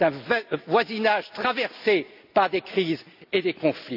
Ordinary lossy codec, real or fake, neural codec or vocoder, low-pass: none; real; none; 5.4 kHz